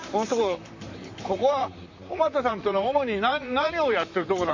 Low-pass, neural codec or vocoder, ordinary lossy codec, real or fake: 7.2 kHz; vocoder, 44.1 kHz, 128 mel bands, Pupu-Vocoder; MP3, 48 kbps; fake